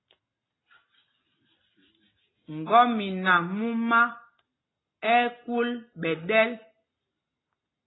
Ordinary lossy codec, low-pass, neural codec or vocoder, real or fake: AAC, 16 kbps; 7.2 kHz; none; real